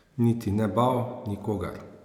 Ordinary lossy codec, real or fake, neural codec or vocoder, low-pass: none; real; none; 19.8 kHz